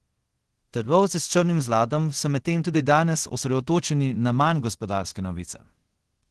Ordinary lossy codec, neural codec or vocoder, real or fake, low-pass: Opus, 16 kbps; codec, 24 kHz, 0.5 kbps, DualCodec; fake; 10.8 kHz